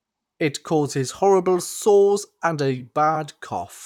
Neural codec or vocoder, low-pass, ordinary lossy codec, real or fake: vocoder, 44.1 kHz, 128 mel bands, Pupu-Vocoder; 19.8 kHz; none; fake